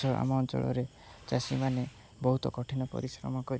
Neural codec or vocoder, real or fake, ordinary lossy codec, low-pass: none; real; none; none